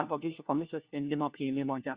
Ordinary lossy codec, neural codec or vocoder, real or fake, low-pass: Opus, 64 kbps; codec, 16 kHz, 1 kbps, FunCodec, trained on LibriTTS, 50 frames a second; fake; 3.6 kHz